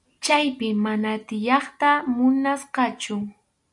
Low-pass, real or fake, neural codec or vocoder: 10.8 kHz; real; none